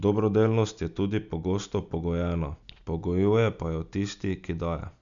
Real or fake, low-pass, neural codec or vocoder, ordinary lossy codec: real; 7.2 kHz; none; none